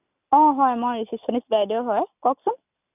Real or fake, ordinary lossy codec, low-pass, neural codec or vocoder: real; none; 3.6 kHz; none